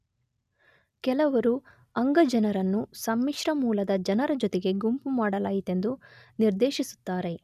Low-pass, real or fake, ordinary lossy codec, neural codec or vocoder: 14.4 kHz; real; none; none